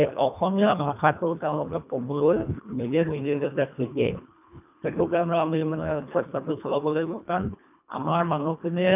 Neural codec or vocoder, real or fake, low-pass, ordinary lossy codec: codec, 24 kHz, 1.5 kbps, HILCodec; fake; 3.6 kHz; none